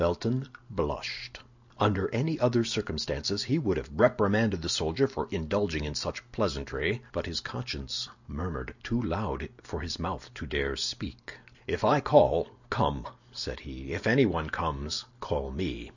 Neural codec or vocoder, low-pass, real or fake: none; 7.2 kHz; real